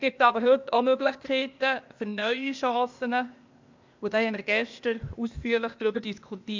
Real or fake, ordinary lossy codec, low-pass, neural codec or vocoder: fake; MP3, 64 kbps; 7.2 kHz; codec, 16 kHz, 0.8 kbps, ZipCodec